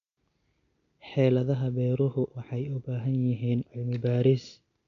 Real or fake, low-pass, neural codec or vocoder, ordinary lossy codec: real; 7.2 kHz; none; none